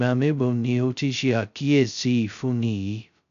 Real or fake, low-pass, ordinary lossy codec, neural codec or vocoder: fake; 7.2 kHz; none; codec, 16 kHz, 0.2 kbps, FocalCodec